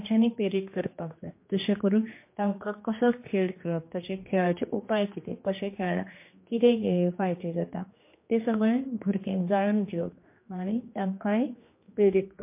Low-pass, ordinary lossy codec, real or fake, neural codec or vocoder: 3.6 kHz; MP3, 24 kbps; fake; codec, 16 kHz, 2 kbps, X-Codec, HuBERT features, trained on general audio